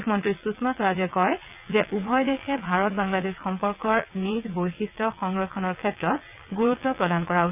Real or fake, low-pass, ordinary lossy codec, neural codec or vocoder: fake; 3.6 kHz; none; vocoder, 22.05 kHz, 80 mel bands, WaveNeXt